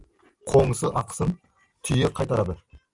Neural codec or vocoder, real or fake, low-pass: none; real; 10.8 kHz